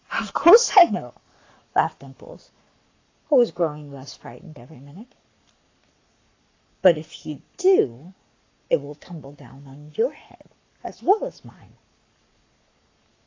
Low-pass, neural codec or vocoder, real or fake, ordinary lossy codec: 7.2 kHz; codec, 44.1 kHz, 7.8 kbps, Pupu-Codec; fake; AAC, 32 kbps